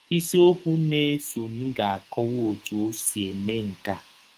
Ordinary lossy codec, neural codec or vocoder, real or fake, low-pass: Opus, 32 kbps; codec, 44.1 kHz, 2.6 kbps, SNAC; fake; 14.4 kHz